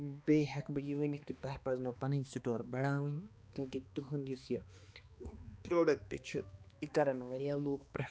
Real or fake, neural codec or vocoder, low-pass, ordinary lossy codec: fake; codec, 16 kHz, 2 kbps, X-Codec, HuBERT features, trained on balanced general audio; none; none